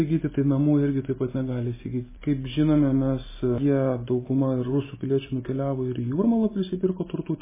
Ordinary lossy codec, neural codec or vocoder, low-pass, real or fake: MP3, 16 kbps; none; 3.6 kHz; real